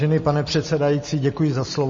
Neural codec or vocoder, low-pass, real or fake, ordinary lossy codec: none; 7.2 kHz; real; MP3, 32 kbps